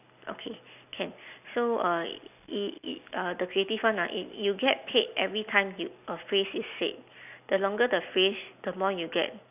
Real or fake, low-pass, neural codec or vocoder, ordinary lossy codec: real; 3.6 kHz; none; none